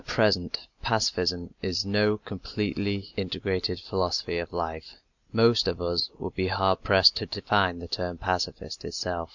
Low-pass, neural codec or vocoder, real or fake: 7.2 kHz; none; real